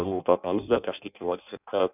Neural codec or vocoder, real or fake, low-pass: codec, 16 kHz in and 24 kHz out, 0.6 kbps, FireRedTTS-2 codec; fake; 3.6 kHz